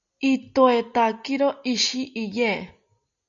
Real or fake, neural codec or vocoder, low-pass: real; none; 7.2 kHz